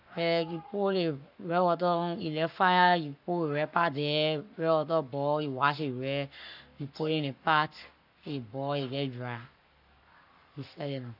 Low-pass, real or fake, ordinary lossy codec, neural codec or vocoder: 5.4 kHz; fake; none; codec, 44.1 kHz, 7.8 kbps, Pupu-Codec